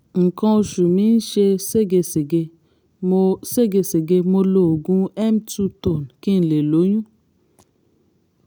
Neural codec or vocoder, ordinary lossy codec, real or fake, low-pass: none; none; real; none